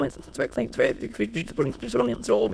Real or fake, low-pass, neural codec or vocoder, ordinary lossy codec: fake; none; autoencoder, 22.05 kHz, a latent of 192 numbers a frame, VITS, trained on many speakers; none